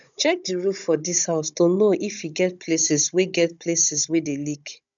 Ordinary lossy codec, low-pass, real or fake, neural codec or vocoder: none; 7.2 kHz; fake; codec, 16 kHz, 16 kbps, FunCodec, trained on Chinese and English, 50 frames a second